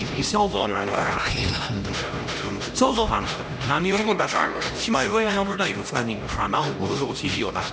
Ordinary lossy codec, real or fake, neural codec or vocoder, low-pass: none; fake; codec, 16 kHz, 1 kbps, X-Codec, HuBERT features, trained on LibriSpeech; none